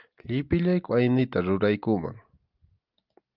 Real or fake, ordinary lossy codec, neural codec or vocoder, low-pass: real; Opus, 32 kbps; none; 5.4 kHz